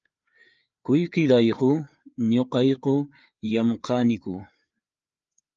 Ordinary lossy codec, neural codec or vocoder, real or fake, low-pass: Opus, 24 kbps; codec, 16 kHz, 4 kbps, FreqCodec, larger model; fake; 7.2 kHz